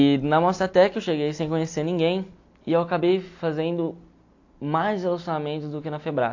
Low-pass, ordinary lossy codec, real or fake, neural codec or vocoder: 7.2 kHz; AAC, 48 kbps; real; none